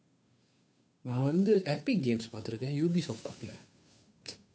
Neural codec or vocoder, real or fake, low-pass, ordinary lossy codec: codec, 16 kHz, 2 kbps, FunCodec, trained on Chinese and English, 25 frames a second; fake; none; none